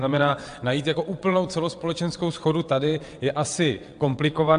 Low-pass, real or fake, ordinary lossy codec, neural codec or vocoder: 9.9 kHz; fake; AAC, 64 kbps; vocoder, 22.05 kHz, 80 mel bands, WaveNeXt